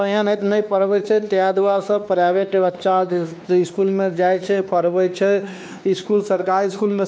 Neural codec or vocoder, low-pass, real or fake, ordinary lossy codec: codec, 16 kHz, 2 kbps, X-Codec, WavLM features, trained on Multilingual LibriSpeech; none; fake; none